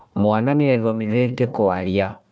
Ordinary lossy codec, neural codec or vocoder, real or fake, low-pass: none; codec, 16 kHz, 1 kbps, FunCodec, trained on Chinese and English, 50 frames a second; fake; none